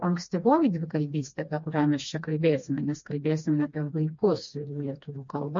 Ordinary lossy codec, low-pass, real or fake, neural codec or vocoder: MP3, 48 kbps; 7.2 kHz; fake; codec, 16 kHz, 2 kbps, FreqCodec, smaller model